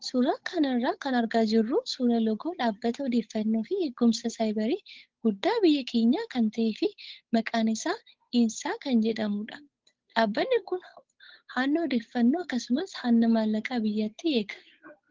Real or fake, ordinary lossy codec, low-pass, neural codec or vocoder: fake; Opus, 16 kbps; 7.2 kHz; codec, 16 kHz, 8 kbps, FunCodec, trained on Chinese and English, 25 frames a second